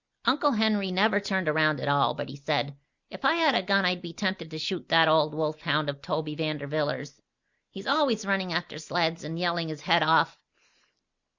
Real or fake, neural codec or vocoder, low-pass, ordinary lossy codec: real; none; 7.2 kHz; Opus, 64 kbps